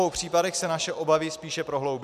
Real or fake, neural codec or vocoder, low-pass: real; none; 14.4 kHz